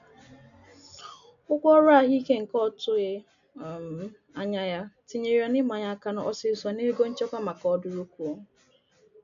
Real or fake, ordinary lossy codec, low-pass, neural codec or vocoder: real; none; 7.2 kHz; none